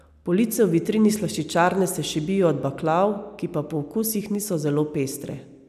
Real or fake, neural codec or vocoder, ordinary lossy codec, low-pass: real; none; none; 14.4 kHz